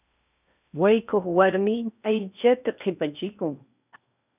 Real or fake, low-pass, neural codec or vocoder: fake; 3.6 kHz; codec, 16 kHz in and 24 kHz out, 0.8 kbps, FocalCodec, streaming, 65536 codes